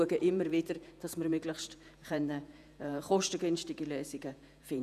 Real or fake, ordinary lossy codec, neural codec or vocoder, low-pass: fake; none; vocoder, 48 kHz, 128 mel bands, Vocos; 14.4 kHz